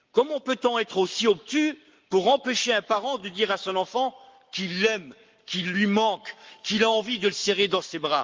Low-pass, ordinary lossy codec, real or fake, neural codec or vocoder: 7.2 kHz; Opus, 32 kbps; fake; codec, 44.1 kHz, 7.8 kbps, Pupu-Codec